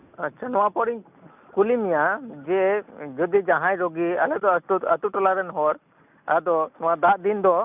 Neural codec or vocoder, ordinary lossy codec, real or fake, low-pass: none; none; real; 3.6 kHz